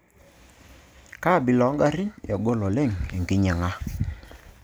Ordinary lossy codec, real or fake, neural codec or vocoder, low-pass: none; real; none; none